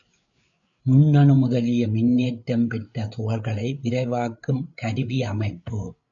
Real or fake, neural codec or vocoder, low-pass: fake; codec, 16 kHz, 8 kbps, FreqCodec, larger model; 7.2 kHz